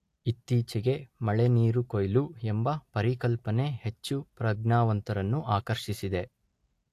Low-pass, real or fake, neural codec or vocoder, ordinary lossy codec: 14.4 kHz; real; none; AAC, 64 kbps